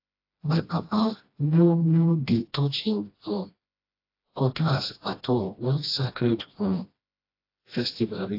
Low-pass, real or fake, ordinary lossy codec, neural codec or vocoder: 5.4 kHz; fake; AAC, 32 kbps; codec, 16 kHz, 1 kbps, FreqCodec, smaller model